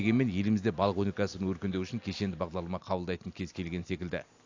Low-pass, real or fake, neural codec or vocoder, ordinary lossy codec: 7.2 kHz; real; none; AAC, 48 kbps